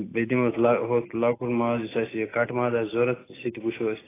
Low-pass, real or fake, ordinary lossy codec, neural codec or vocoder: 3.6 kHz; real; AAC, 16 kbps; none